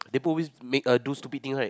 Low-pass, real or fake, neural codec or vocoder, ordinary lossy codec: none; real; none; none